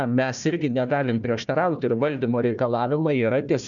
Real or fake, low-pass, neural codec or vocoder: fake; 7.2 kHz; codec, 16 kHz, 1 kbps, FunCodec, trained on Chinese and English, 50 frames a second